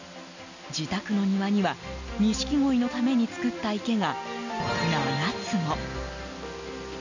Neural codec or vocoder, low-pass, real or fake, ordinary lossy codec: none; 7.2 kHz; real; none